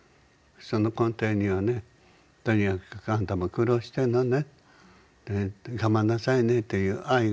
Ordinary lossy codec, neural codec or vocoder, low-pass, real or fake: none; none; none; real